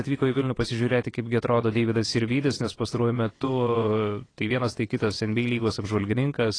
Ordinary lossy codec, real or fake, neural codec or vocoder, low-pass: AAC, 32 kbps; fake; vocoder, 22.05 kHz, 80 mel bands, WaveNeXt; 9.9 kHz